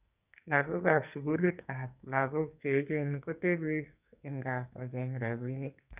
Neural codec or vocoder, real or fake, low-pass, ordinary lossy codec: codec, 44.1 kHz, 2.6 kbps, SNAC; fake; 3.6 kHz; none